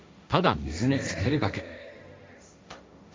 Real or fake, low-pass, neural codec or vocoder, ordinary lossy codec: fake; none; codec, 16 kHz, 1.1 kbps, Voila-Tokenizer; none